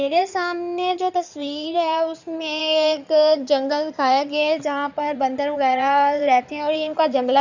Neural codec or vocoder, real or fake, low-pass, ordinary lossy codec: codec, 16 kHz in and 24 kHz out, 2.2 kbps, FireRedTTS-2 codec; fake; 7.2 kHz; none